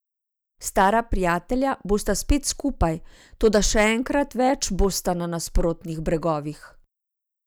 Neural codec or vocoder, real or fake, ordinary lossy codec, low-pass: none; real; none; none